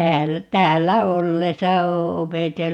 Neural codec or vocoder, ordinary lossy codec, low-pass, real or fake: vocoder, 48 kHz, 128 mel bands, Vocos; none; 19.8 kHz; fake